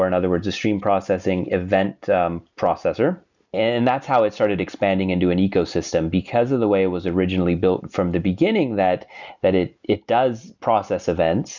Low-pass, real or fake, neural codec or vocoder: 7.2 kHz; real; none